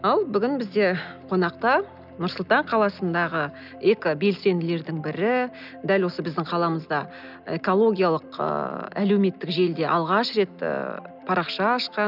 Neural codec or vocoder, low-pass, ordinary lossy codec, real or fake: none; 5.4 kHz; none; real